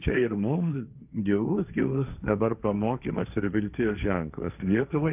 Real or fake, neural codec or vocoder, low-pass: fake; codec, 16 kHz, 1.1 kbps, Voila-Tokenizer; 3.6 kHz